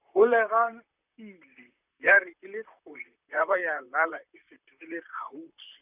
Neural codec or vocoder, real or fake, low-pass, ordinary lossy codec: vocoder, 44.1 kHz, 128 mel bands, Pupu-Vocoder; fake; 3.6 kHz; none